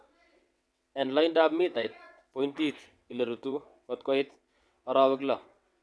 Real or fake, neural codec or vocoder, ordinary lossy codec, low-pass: fake; vocoder, 22.05 kHz, 80 mel bands, WaveNeXt; none; none